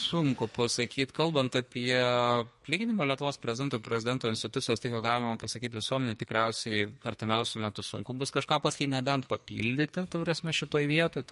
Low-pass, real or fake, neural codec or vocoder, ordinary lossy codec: 14.4 kHz; fake; codec, 44.1 kHz, 2.6 kbps, SNAC; MP3, 48 kbps